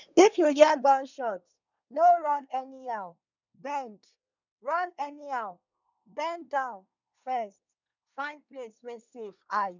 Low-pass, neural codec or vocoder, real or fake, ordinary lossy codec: 7.2 kHz; codec, 24 kHz, 1 kbps, SNAC; fake; none